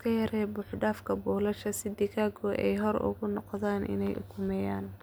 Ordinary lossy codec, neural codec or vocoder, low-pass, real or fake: none; none; none; real